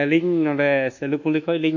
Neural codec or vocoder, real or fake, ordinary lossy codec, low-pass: codec, 24 kHz, 1.2 kbps, DualCodec; fake; none; 7.2 kHz